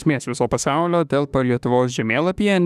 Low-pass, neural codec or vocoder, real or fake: 14.4 kHz; autoencoder, 48 kHz, 32 numbers a frame, DAC-VAE, trained on Japanese speech; fake